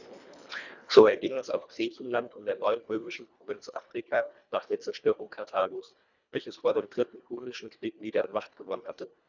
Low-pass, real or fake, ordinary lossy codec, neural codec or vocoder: 7.2 kHz; fake; none; codec, 24 kHz, 1.5 kbps, HILCodec